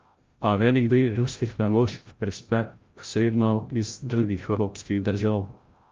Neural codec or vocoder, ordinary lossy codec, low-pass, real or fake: codec, 16 kHz, 0.5 kbps, FreqCodec, larger model; Opus, 32 kbps; 7.2 kHz; fake